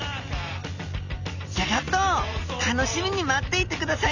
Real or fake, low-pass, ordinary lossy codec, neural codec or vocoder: real; 7.2 kHz; none; none